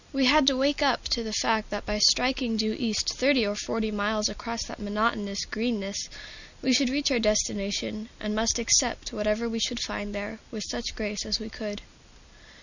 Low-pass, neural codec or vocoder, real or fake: 7.2 kHz; none; real